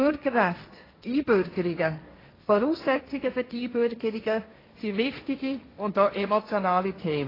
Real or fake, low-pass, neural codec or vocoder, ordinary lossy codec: fake; 5.4 kHz; codec, 16 kHz, 1.1 kbps, Voila-Tokenizer; AAC, 24 kbps